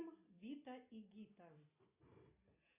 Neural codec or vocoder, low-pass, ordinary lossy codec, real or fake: none; 3.6 kHz; AAC, 32 kbps; real